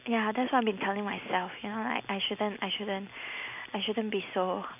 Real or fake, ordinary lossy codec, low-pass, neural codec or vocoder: real; none; 3.6 kHz; none